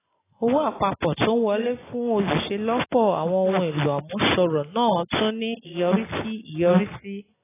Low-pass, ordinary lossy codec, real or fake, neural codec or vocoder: 3.6 kHz; AAC, 16 kbps; real; none